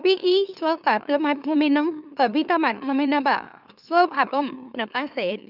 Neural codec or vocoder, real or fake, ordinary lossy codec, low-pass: autoencoder, 44.1 kHz, a latent of 192 numbers a frame, MeloTTS; fake; none; 5.4 kHz